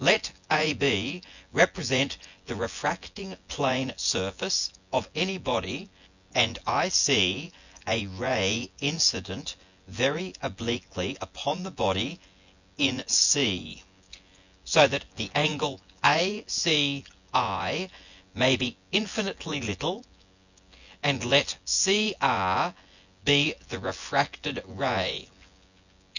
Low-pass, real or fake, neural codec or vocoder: 7.2 kHz; fake; vocoder, 24 kHz, 100 mel bands, Vocos